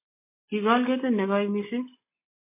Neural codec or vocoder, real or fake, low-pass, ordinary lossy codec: none; real; 3.6 kHz; MP3, 24 kbps